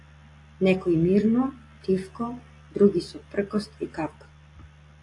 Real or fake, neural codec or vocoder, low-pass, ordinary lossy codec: real; none; 10.8 kHz; AAC, 48 kbps